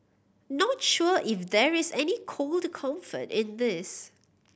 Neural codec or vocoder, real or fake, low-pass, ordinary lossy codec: none; real; none; none